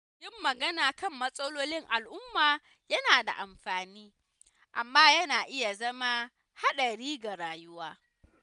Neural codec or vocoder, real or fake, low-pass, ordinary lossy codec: none; real; 10.8 kHz; none